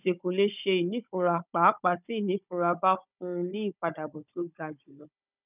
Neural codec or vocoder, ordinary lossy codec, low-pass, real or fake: codec, 16 kHz, 16 kbps, FunCodec, trained on Chinese and English, 50 frames a second; none; 3.6 kHz; fake